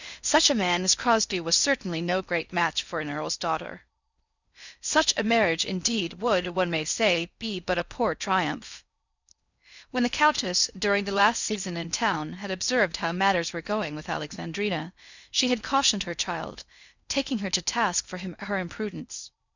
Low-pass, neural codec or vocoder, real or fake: 7.2 kHz; codec, 16 kHz in and 24 kHz out, 0.6 kbps, FocalCodec, streaming, 4096 codes; fake